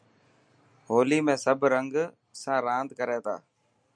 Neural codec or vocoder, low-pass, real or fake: none; 9.9 kHz; real